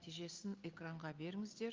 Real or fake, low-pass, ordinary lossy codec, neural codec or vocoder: real; 7.2 kHz; Opus, 32 kbps; none